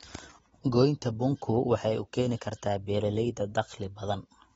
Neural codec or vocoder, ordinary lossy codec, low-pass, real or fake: none; AAC, 24 kbps; 19.8 kHz; real